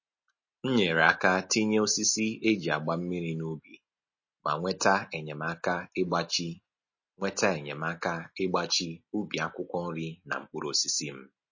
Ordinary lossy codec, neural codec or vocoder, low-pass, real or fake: MP3, 32 kbps; none; 7.2 kHz; real